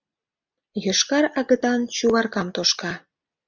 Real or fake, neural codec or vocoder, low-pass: real; none; 7.2 kHz